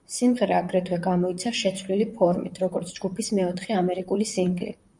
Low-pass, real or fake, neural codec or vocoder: 10.8 kHz; fake; vocoder, 44.1 kHz, 128 mel bands, Pupu-Vocoder